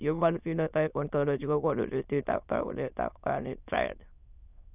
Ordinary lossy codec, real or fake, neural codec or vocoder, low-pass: none; fake; autoencoder, 22.05 kHz, a latent of 192 numbers a frame, VITS, trained on many speakers; 3.6 kHz